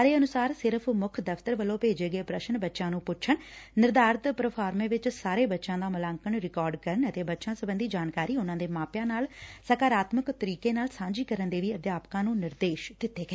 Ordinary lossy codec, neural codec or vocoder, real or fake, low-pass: none; none; real; none